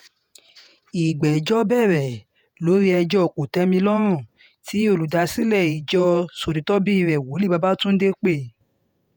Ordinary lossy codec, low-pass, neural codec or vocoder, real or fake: none; none; vocoder, 48 kHz, 128 mel bands, Vocos; fake